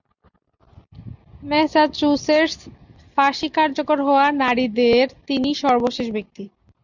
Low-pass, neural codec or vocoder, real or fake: 7.2 kHz; none; real